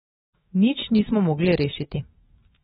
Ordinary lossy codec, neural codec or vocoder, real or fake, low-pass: AAC, 16 kbps; autoencoder, 48 kHz, 128 numbers a frame, DAC-VAE, trained on Japanese speech; fake; 19.8 kHz